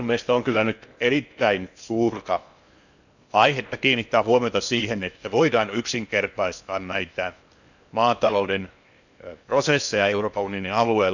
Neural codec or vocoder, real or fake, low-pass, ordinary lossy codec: codec, 16 kHz in and 24 kHz out, 0.8 kbps, FocalCodec, streaming, 65536 codes; fake; 7.2 kHz; none